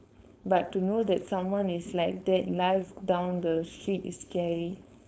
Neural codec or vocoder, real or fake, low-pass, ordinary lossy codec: codec, 16 kHz, 4.8 kbps, FACodec; fake; none; none